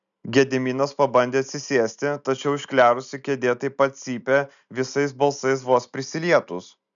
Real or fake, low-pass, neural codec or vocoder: real; 7.2 kHz; none